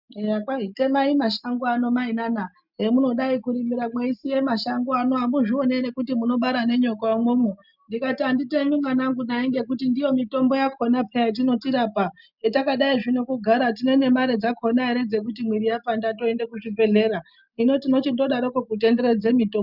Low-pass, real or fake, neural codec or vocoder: 5.4 kHz; real; none